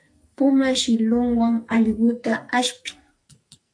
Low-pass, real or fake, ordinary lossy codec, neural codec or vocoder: 9.9 kHz; fake; MP3, 64 kbps; codec, 44.1 kHz, 2.6 kbps, SNAC